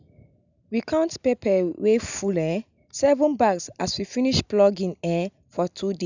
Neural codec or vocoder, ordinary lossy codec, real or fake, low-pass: none; none; real; 7.2 kHz